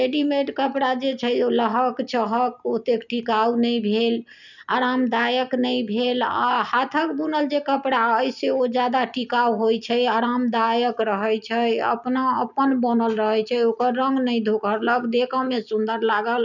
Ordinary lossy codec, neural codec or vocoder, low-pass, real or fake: none; none; 7.2 kHz; real